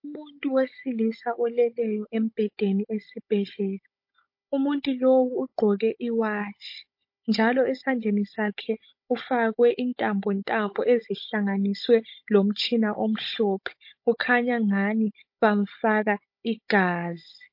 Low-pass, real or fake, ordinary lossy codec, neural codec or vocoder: 5.4 kHz; fake; MP3, 32 kbps; codec, 16 kHz, 16 kbps, FunCodec, trained on Chinese and English, 50 frames a second